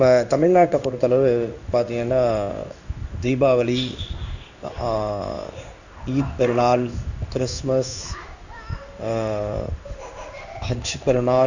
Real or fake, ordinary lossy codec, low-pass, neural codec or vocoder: fake; none; 7.2 kHz; codec, 16 kHz in and 24 kHz out, 1 kbps, XY-Tokenizer